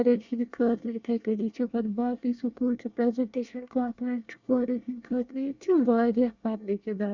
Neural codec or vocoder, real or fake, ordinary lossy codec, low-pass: codec, 24 kHz, 1 kbps, SNAC; fake; Opus, 64 kbps; 7.2 kHz